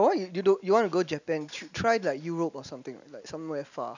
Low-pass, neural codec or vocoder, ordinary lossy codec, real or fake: 7.2 kHz; none; none; real